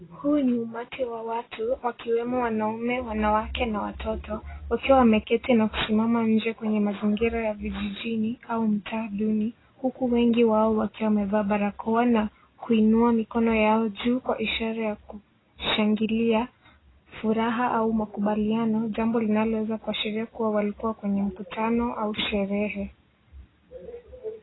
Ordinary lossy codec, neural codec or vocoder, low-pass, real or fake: AAC, 16 kbps; none; 7.2 kHz; real